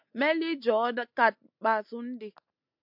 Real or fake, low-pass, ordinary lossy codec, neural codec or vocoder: real; 5.4 kHz; MP3, 48 kbps; none